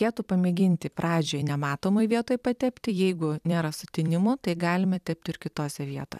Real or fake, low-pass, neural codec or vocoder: fake; 14.4 kHz; vocoder, 44.1 kHz, 128 mel bands every 256 samples, BigVGAN v2